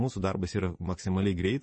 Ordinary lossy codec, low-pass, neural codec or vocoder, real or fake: MP3, 32 kbps; 10.8 kHz; vocoder, 24 kHz, 100 mel bands, Vocos; fake